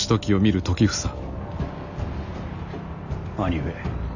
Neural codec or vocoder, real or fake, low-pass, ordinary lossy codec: none; real; 7.2 kHz; none